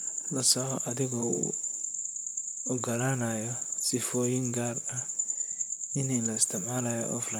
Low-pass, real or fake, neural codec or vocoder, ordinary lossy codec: none; fake; vocoder, 44.1 kHz, 128 mel bands, Pupu-Vocoder; none